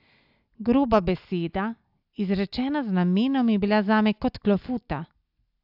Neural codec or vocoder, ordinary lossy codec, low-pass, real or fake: none; AAC, 48 kbps; 5.4 kHz; real